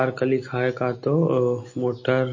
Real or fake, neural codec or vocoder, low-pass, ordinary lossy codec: real; none; 7.2 kHz; MP3, 32 kbps